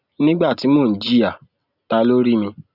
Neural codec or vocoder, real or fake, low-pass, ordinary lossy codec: none; real; 5.4 kHz; none